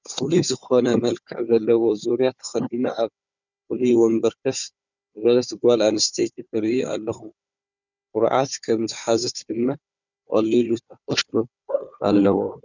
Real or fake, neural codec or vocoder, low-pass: fake; codec, 16 kHz, 4 kbps, FunCodec, trained on Chinese and English, 50 frames a second; 7.2 kHz